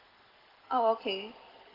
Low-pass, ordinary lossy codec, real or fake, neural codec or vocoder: 5.4 kHz; Opus, 16 kbps; real; none